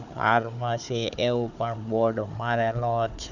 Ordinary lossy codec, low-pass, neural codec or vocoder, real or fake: none; 7.2 kHz; codec, 16 kHz, 4 kbps, FunCodec, trained on Chinese and English, 50 frames a second; fake